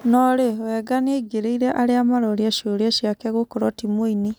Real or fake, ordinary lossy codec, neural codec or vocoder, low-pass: real; none; none; none